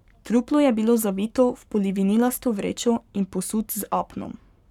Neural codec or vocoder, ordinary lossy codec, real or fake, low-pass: codec, 44.1 kHz, 7.8 kbps, Pupu-Codec; none; fake; 19.8 kHz